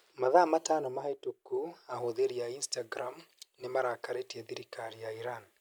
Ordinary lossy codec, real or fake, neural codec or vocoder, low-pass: none; real; none; none